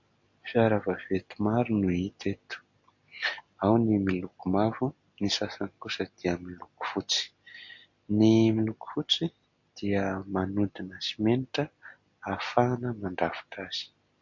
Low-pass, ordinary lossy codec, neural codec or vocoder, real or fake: 7.2 kHz; MP3, 48 kbps; none; real